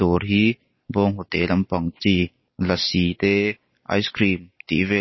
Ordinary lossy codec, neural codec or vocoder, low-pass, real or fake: MP3, 24 kbps; none; 7.2 kHz; real